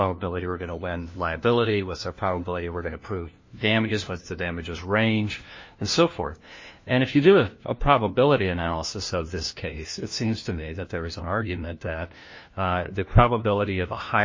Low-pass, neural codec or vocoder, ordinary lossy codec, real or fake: 7.2 kHz; codec, 16 kHz, 1 kbps, FunCodec, trained on LibriTTS, 50 frames a second; MP3, 32 kbps; fake